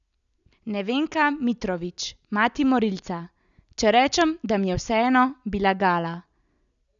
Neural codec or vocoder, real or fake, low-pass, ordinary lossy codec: none; real; 7.2 kHz; none